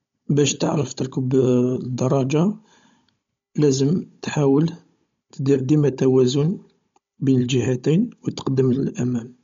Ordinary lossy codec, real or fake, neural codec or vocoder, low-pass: MP3, 48 kbps; fake; codec, 16 kHz, 16 kbps, FunCodec, trained on Chinese and English, 50 frames a second; 7.2 kHz